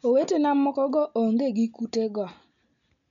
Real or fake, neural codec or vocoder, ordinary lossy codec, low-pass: real; none; none; 7.2 kHz